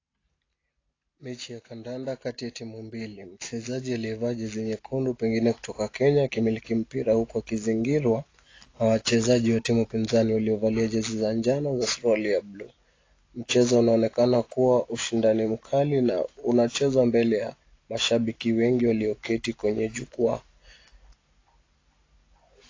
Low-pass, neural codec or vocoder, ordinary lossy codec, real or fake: 7.2 kHz; none; AAC, 32 kbps; real